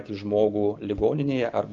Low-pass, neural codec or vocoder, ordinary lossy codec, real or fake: 7.2 kHz; none; Opus, 24 kbps; real